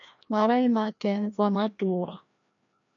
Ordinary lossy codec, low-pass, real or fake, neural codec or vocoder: AAC, 64 kbps; 7.2 kHz; fake; codec, 16 kHz, 1 kbps, FreqCodec, larger model